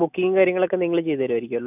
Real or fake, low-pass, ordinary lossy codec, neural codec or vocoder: real; 3.6 kHz; none; none